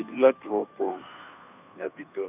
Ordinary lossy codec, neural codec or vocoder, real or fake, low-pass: none; codec, 16 kHz, 2 kbps, FunCodec, trained on Chinese and English, 25 frames a second; fake; 3.6 kHz